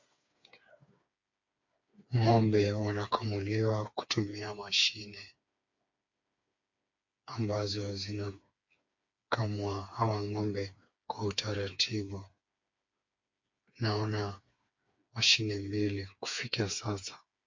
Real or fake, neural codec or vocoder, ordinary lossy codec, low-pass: fake; codec, 16 kHz, 4 kbps, FreqCodec, smaller model; MP3, 48 kbps; 7.2 kHz